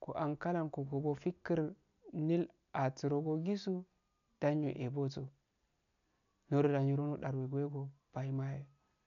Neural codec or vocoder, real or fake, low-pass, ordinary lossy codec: none; real; 7.2 kHz; none